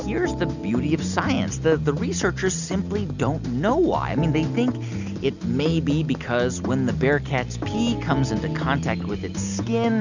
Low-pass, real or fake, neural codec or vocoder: 7.2 kHz; real; none